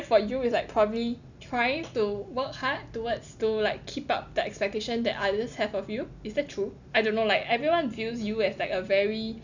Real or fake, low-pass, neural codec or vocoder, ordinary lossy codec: real; 7.2 kHz; none; none